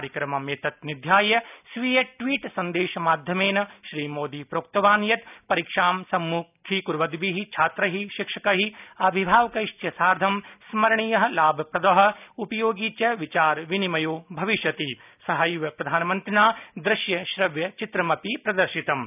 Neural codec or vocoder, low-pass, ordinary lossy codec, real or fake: none; 3.6 kHz; none; real